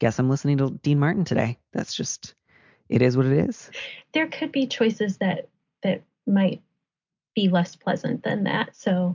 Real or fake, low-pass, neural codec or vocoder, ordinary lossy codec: real; 7.2 kHz; none; MP3, 64 kbps